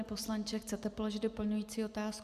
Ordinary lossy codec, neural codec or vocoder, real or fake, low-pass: Opus, 64 kbps; none; real; 14.4 kHz